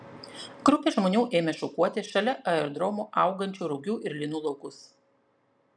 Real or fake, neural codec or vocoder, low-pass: real; none; 9.9 kHz